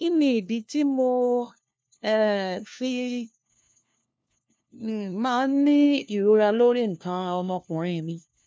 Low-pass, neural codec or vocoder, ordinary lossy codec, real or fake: none; codec, 16 kHz, 1 kbps, FunCodec, trained on LibriTTS, 50 frames a second; none; fake